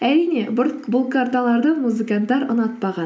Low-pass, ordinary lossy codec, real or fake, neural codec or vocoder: none; none; real; none